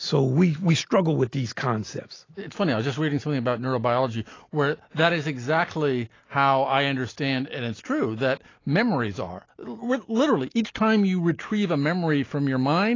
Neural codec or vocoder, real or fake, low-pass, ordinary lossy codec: none; real; 7.2 kHz; AAC, 32 kbps